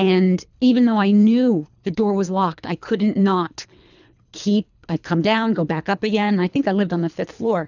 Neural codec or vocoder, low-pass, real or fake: codec, 24 kHz, 3 kbps, HILCodec; 7.2 kHz; fake